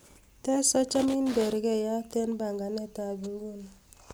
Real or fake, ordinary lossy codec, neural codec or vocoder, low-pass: real; none; none; none